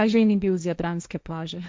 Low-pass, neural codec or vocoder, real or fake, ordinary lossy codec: none; codec, 16 kHz, 1.1 kbps, Voila-Tokenizer; fake; none